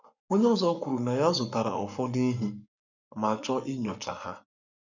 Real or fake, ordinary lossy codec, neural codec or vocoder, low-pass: fake; none; codec, 44.1 kHz, 7.8 kbps, Pupu-Codec; 7.2 kHz